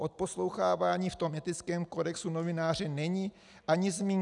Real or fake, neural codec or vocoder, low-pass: real; none; 10.8 kHz